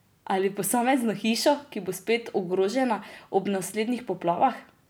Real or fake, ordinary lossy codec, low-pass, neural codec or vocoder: real; none; none; none